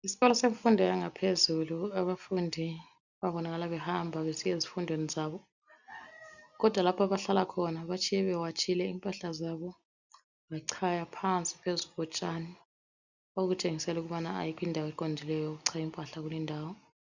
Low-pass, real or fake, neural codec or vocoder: 7.2 kHz; real; none